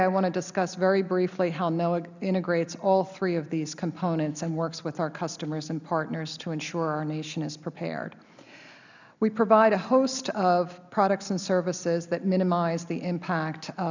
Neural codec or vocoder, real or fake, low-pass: none; real; 7.2 kHz